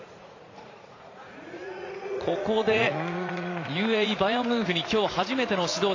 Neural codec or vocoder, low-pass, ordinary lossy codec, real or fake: vocoder, 44.1 kHz, 80 mel bands, Vocos; 7.2 kHz; AAC, 32 kbps; fake